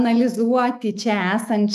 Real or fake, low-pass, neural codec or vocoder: fake; 14.4 kHz; autoencoder, 48 kHz, 128 numbers a frame, DAC-VAE, trained on Japanese speech